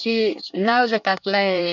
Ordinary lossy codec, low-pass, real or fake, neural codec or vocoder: none; 7.2 kHz; fake; codec, 24 kHz, 1 kbps, SNAC